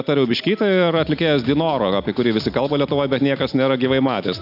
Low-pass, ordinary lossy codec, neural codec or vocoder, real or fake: 5.4 kHz; AAC, 48 kbps; none; real